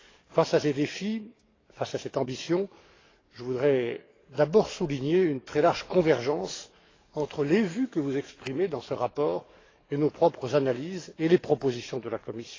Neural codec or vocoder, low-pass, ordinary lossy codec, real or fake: codec, 44.1 kHz, 7.8 kbps, DAC; 7.2 kHz; AAC, 32 kbps; fake